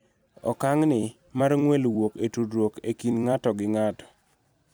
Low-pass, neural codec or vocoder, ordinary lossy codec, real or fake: none; vocoder, 44.1 kHz, 128 mel bands every 256 samples, BigVGAN v2; none; fake